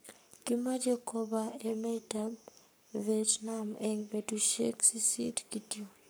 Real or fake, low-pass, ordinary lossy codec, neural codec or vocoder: fake; none; none; codec, 44.1 kHz, 7.8 kbps, Pupu-Codec